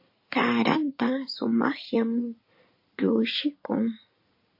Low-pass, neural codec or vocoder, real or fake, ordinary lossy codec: 5.4 kHz; codec, 16 kHz in and 24 kHz out, 2.2 kbps, FireRedTTS-2 codec; fake; MP3, 32 kbps